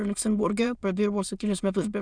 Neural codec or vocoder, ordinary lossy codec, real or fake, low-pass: autoencoder, 22.05 kHz, a latent of 192 numbers a frame, VITS, trained on many speakers; Opus, 64 kbps; fake; 9.9 kHz